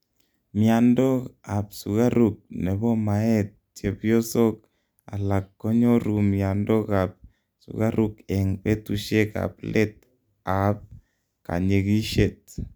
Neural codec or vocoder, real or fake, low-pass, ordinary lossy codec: none; real; none; none